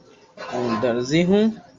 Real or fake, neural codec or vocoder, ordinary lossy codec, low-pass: real; none; Opus, 32 kbps; 7.2 kHz